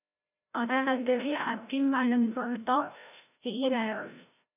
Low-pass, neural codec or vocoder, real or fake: 3.6 kHz; codec, 16 kHz, 0.5 kbps, FreqCodec, larger model; fake